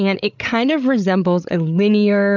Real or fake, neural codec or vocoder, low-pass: fake; codec, 16 kHz, 8 kbps, FreqCodec, larger model; 7.2 kHz